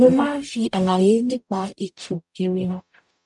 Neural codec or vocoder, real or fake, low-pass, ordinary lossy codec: codec, 44.1 kHz, 0.9 kbps, DAC; fake; 10.8 kHz; none